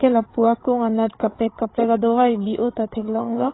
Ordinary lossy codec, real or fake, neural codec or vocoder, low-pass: AAC, 16 kbps; fake; codec, 16 kHz, 8 kbps, FreqCodec, larger model; 7.2 kHz